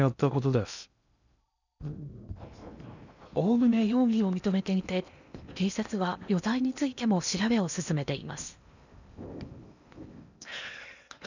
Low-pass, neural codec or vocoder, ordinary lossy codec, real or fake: 7.2 kHz; codec, 16 kHz in and 24 kHz out, 0.8 kbps, FocalCodec, streaming, 65536 codes; none; fake